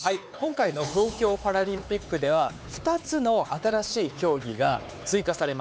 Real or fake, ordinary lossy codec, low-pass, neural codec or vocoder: fake; none; none; codec, 16 kHz, 4 kbps, X-Codec, HuBERT features, trained on LibriSpeech